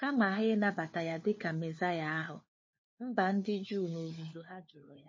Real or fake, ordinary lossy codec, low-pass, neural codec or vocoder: fake; MP3, 32 kbps; 7.2 kHz; codec, 16 kHz, 4 kbps, FunCodec, trained on LibriTTS, 50 frames a second